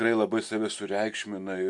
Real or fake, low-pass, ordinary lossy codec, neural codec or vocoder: real; 10.8 kHz; MP3, 64 kbps; none